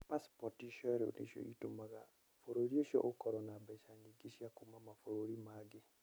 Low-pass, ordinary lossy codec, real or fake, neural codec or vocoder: none; none; real; none